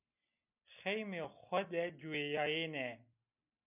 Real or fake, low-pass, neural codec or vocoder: real; 3.6 kHz; none